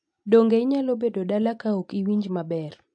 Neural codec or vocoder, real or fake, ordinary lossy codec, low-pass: none; real; AAC, 64 kbps; 9.9 kHz